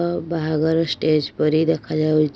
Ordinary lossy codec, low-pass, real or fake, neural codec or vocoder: none; none; real; none